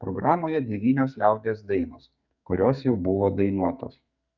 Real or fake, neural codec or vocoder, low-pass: fake; codec, 44.1 kHz, 2.6 kbps, SNAC; 7.2 kHz